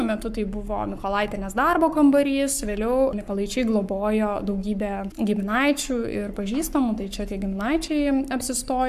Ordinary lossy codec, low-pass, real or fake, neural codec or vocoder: AAC, 96 kbps; 14.4 kHz; fake; codec, 44.1 kHz, 7.8 kbps, Pupu-Codec